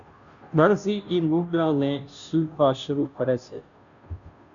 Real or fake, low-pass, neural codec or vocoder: fake; 7.2 kHz; codec, 16 kHz, 0.5 kbps, FunCodec, trained on Chinese and English, 25 frames a second